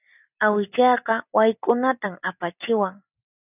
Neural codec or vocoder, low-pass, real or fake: none; 3.6 kHz; real